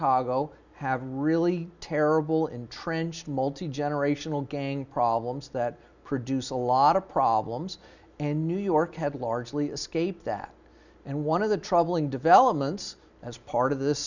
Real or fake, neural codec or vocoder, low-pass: real; none; 7.2 kHz